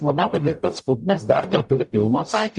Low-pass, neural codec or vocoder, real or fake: 10.8 kHz; codec, 44.1 kHz, 0.9 kbps, DAC; fake